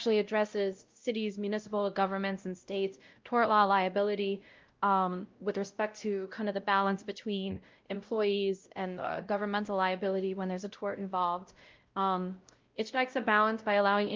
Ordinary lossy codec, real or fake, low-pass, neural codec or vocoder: Opus, 32 kbps; fake; 7.2 kHz; codec, 16 kHz, 0.5 kbps, X-Codec, WavLM features, trained on Multilingual LibriSpeech